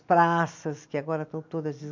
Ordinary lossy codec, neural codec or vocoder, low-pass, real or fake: MP3, 48 kbps; none; 7.2 kHz; real